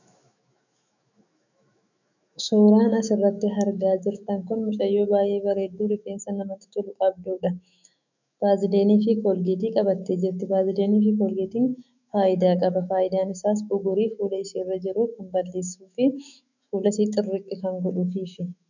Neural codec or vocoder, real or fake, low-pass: autoencoder, 48 kHz, 128 numbers a frame, DAC-VAE, trained on Japanese speech; fake; 7.2 kHz